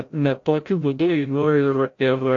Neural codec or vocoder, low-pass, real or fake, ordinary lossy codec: codec, 16 kHz, 0.5 kbps, FreqCodec, larger model; 7.2 kHz; fake; AAC, 48 kbps